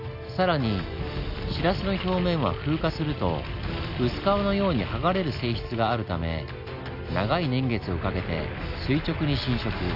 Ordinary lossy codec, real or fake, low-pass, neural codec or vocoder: none; real; 5.4 kHz; none